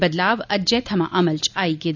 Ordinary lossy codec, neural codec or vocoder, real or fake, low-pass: none; none; real; 7.2 kHz